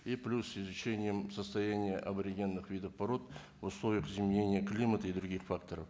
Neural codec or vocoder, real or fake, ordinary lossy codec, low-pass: none; real; none; none